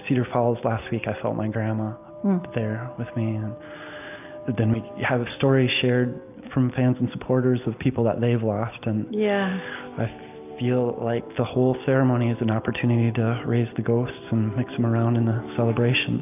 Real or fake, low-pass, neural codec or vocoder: real; 3.6 kHz; none